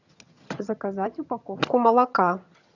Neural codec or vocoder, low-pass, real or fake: vocoder, 22.05 kHz, 80 mel bands, HiFi-GAN; 7.2 kHz; fake